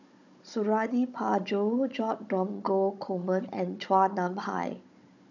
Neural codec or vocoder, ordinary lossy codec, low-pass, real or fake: codec, 16 kHz, 16 kbps, FunCodec, trained on Chinese and English, 50 frames a second; none; 7.2 kHz; fake